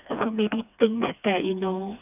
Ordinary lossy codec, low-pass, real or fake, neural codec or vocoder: none; 3.6 kHz; fake; codec, 16 kHz, 2 kbps, FreqCodec, smaller model